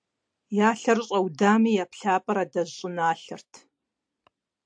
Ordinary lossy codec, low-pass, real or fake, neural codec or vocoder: AAC, 64 kbps; 9.9 kHz; real; none